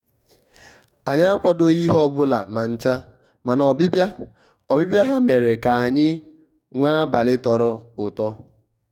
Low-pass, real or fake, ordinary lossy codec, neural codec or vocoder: 19.8 kHz; fake; none; codec, 44.1 kHz, 2.6 kbps, DAC